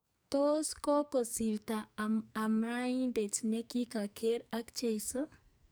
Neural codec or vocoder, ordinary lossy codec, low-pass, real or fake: codec, 44.1 kHz, 2.6 kbps, SNAC; none; none; fake